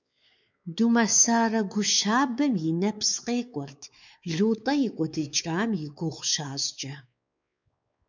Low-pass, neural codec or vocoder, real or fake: 7.2 kHz; codec, 16 kHz, 4 kbps, X-Codec, WavLM features, trained on Multilingual LibriSpeech; fake